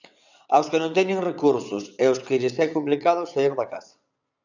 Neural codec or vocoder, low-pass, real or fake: vocoder, 22.05 kHz, 80 mel bands, WaveNeXt; 7.2 kHz; fake